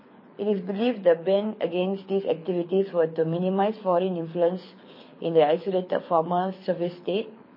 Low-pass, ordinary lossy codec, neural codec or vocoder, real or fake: 5.4 kHz; MP3, 24 kbps; codec, 24 kHz, 6 kbps, HILCodec; fake